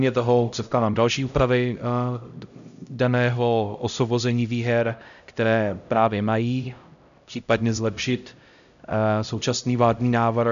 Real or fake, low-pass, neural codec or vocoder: fake; 7.2 kHz; codec, 16 kHz, 0.5 kbps, X-Codec, HuBERT features, trained on LibriSpeech